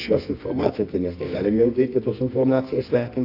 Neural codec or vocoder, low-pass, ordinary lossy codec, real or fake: codec, 24 kHz, 0.9 kbps, WavTokenizer, medium music audio release; 5.4 kHz; AAC, 32 kbps; fake